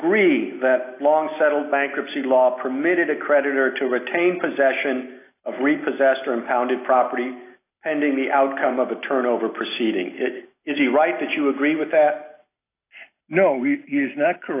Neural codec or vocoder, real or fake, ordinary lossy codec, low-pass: none; real; AAC, 24 kbps; 3.6 kHz